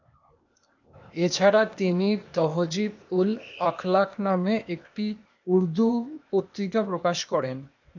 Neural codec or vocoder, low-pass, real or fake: codec, 16 kHz, 0.8 kbps, ZipCodec; 7.2 kHz; fake